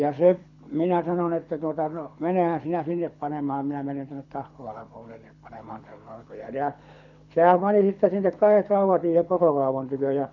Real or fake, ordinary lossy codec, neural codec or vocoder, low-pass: fake; none; codec, 24 kHz, 6 kbps, HILCodec; 7.2 kHz